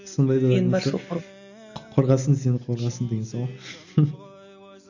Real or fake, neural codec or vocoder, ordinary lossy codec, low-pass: real; none; none; 7.2 kHz